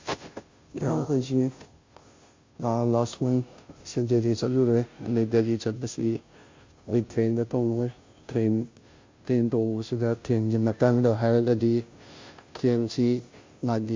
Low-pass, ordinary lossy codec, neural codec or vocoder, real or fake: 7.2 kHz; MP3, 48 kbps; codec, 16 kHz, 0.5 kbps, FunCodec, trained on Chinese and English, 25 frames a second; fake